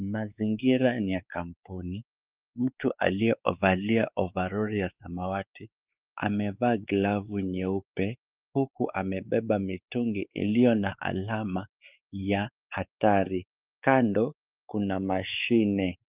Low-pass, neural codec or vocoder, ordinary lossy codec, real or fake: 3.6 kHz; codec, 16 kHz, 4 kbps, X-Codec, WavLM features, trained on Multilingual LibriSpeech; Opus, 32 kbps; fake